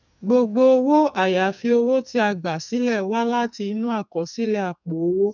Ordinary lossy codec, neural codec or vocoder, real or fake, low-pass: none; codec, 44.1 kHz, 2.6 kbps, SNAC; fake; 7.2 kHz